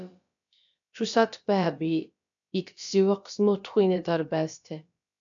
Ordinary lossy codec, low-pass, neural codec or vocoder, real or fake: MP3, 64 kbps; 7.2 kHz; codec, 16 kHz, about 1 kbps, DyCAST, with the encoder's durations; fake